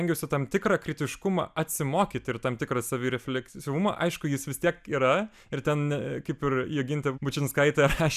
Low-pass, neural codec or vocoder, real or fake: 14.4 kHz; none; real